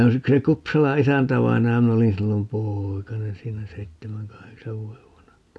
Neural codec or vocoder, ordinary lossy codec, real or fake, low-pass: none; none; real; none